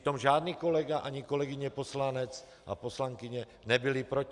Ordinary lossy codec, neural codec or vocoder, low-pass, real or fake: Opus, 64 kbps; none; 10.8 kHz; real